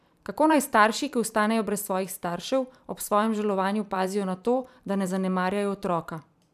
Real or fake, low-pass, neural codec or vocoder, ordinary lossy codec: fake; 14.4 kHz; vocoder, 44.1 kHz, 128 mel bands every 512 samples, BigVGAN v2; none